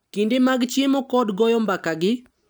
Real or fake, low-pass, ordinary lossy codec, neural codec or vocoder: real; none; none; none